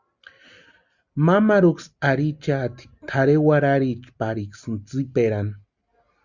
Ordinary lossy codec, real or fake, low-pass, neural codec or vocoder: Opus, 64 kbps; real; 7.2 kHz; none